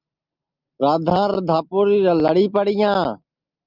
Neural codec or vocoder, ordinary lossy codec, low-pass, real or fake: none; Opus, 32 kbps; 5.4 kHz; real